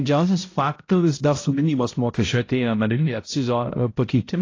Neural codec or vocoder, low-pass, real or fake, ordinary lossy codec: codec, 16 kHz, 0.5 kbps, X-Codec, HuBERT features, trained on balanced general audio; 7.2 kHz; fake; AAC, 32 kbps